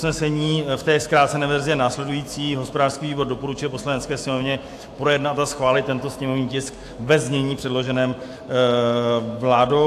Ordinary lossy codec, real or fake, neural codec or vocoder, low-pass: AAC, 64 kbps; fake; autoencoder, 48 kHz, 128 numbers a frame, DAC-VAE, trained on Japanese speech; 14.4 kHz